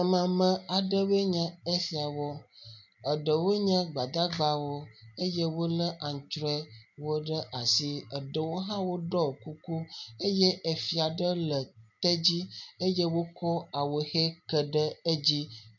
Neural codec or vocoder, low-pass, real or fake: none; 7.2 kHz; real